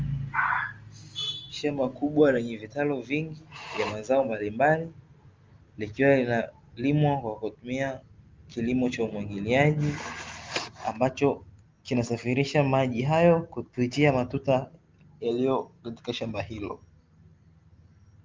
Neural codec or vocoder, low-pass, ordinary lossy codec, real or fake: none; 7.2 kHz; Opus, 32 kbps; real